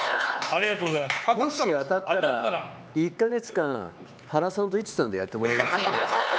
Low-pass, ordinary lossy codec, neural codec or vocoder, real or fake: none; none; codec, 16 kHz, 4 kbps, X-Codec, HuBERT features, trained on LibriSpeech; fake